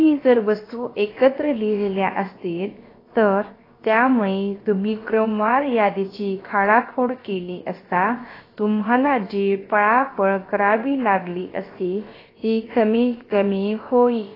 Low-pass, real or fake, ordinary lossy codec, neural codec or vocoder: 5.4 kHz; fake; AAC, 24 kbps; codec, 16 kHz, about 1 kbps, DyCAST, with the encoder's durations